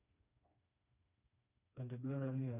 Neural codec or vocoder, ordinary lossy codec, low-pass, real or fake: codec, 16 kHz, 2 kbps, FreqCodec, smaller model; none; 3.6 kHz; fake